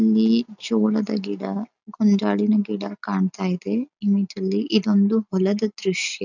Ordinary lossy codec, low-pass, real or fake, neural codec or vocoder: none; 7.2 kHz; real; none